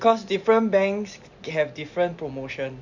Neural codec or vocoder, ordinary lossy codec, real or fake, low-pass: none; none; real; 7.2 kHz